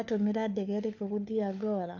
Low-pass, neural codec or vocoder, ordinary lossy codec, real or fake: 7.2 kHz; codec, 16 kHz, 4 kbps, FunCodec, trained on LibriTTS, 50 frames a second; none; fake